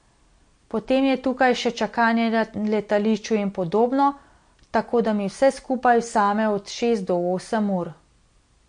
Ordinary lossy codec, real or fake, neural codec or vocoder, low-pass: MP3, 48 kbps; real; none; 9.9 kHz